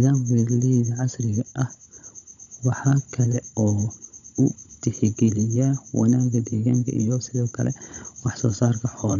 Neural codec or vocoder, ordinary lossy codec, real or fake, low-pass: codec, 16 kHz, 16 kbps, FunCodec, trained on Chinese and English, 50 frames a second; none; fake; 7.2 kHz